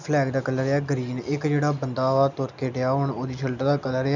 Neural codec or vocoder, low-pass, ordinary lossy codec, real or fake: none; 7.2 kHz; none; real